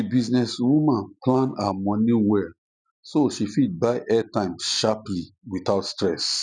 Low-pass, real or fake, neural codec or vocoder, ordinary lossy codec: 9.9 kHz; real; none; none